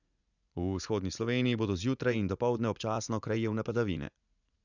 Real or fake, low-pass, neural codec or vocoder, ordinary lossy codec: fake; 7.2 kHz; vocoder, 44.1 kHz, 80 mel bands, Vocos; none